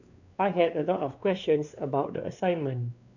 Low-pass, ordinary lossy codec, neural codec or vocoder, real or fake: 7.2 kHz; none; codec, 16 kHz, 2 kbps, X-Codec, WavLM features, trained on Multilingual LibriSpeech; fake